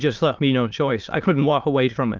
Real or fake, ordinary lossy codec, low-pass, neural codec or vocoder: fake; Opus, 24 kbps; 7.2 kHz; autoencoder, 22.05 kHz, a latent of 192 numbers a frame, VITS, trained on many speakers